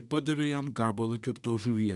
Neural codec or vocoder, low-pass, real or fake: codec, 24 kHz, 1 kbps, SNAC; 10.8 kHz; fake